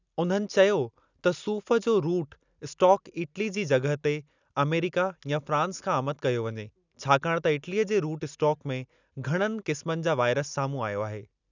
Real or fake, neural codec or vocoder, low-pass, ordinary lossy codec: real; none; 7.2 kHz; none